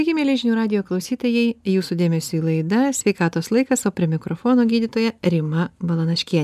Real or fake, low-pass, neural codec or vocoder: real; 14.4 kHz; none